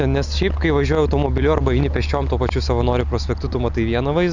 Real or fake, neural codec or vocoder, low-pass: real; none; 7.2 kHz